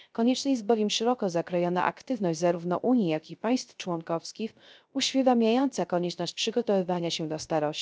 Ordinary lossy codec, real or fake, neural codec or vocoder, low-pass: none; fake; codec, 16 kHz, 0.3 kbps, FocalCodec; none